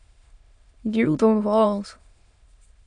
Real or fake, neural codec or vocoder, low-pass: fake; autoencoder, 22.05 kHz, a latent of 192 numbers a frame, VITS, trained on many speakers; 9.9 kHz